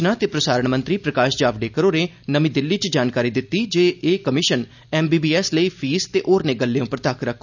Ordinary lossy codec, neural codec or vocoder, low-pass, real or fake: none; none; 7.2 kHz; real